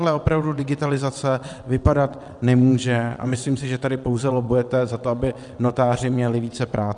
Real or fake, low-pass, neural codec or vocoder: fake; 9.9 kHz; vocoder, 22.05 kHz, 80 mel bands, WaveNeXt